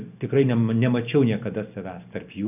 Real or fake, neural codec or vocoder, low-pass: real; none; 3.6 kHz